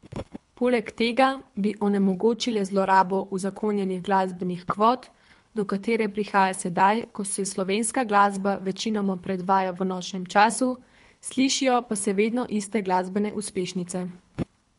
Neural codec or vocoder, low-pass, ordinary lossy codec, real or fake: codec, 24 kHz, 3 kbps, HILCodec; 10.8 kHz; MP3, 64 kbps; fake